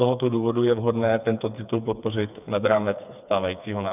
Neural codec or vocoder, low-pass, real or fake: codec, 16 kHz, 4 kbps, FreqCodec, smaller model; 3.6 kHz; fake